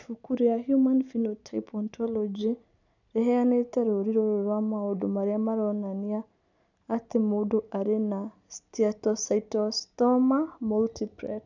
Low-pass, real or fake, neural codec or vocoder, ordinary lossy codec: 7.2 kHz; real; none; none